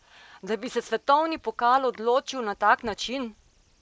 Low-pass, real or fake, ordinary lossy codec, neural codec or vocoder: none; real; none; none